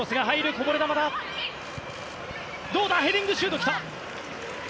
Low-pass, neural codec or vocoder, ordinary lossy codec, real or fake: none; none; none; real